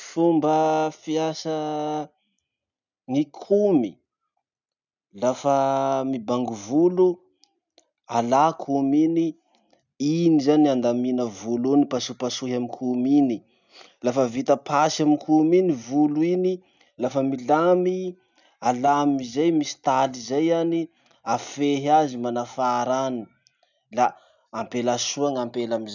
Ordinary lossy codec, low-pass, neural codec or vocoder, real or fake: none; 7.2 kHz; none; real